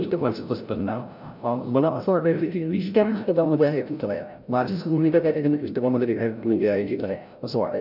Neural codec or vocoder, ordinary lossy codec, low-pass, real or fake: codec, 16 kHz, 0.5 kbps, FreqCodec, larger model; none; 5.4 kHz; fake